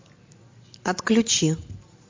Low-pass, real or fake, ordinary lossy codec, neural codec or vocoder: 7.2 kHz; real; MP3, 48 kbps; none